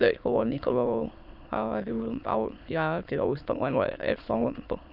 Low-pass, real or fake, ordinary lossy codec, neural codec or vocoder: 5.4 kHz; fake; none; autoencoder, 22.05 kHz, a latent of 192 numbers a frame, VITS, trained on many speakers